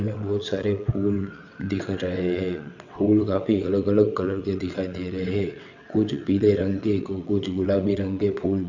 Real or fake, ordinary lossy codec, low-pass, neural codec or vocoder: fake; none; 7.2 kHz; vocoder, 22.05 kHz, 80 mel bands, WaveNeXt